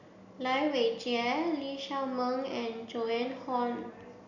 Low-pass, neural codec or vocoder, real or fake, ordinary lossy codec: 7.2 kHz; none; real; none